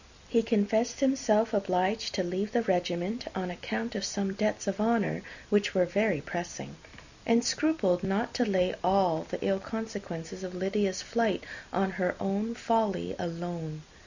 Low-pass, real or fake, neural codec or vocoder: 7.2 kHz; real; none